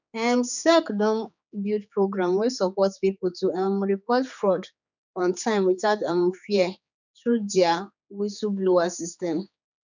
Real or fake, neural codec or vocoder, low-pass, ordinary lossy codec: fake; codec, 16 kHz, 4 kbps, X-Codec, HuBERT features, trained on general audio; 7.2 kHz; none